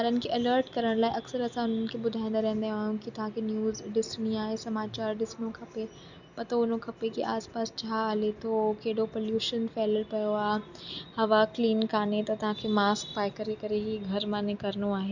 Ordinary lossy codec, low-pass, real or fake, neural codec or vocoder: none; 7.2 kHz; real; none